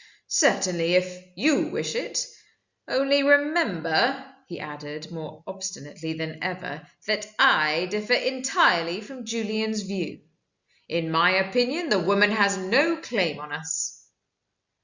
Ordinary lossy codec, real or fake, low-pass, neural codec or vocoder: Opus, 64 kbps; real; 7.2 kHz; none